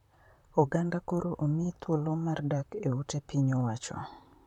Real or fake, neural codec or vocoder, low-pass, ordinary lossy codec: fake; vocoder, 44.1 kHz, 128 mel bands, Pupu-Vocoder; 19.8 kHz; none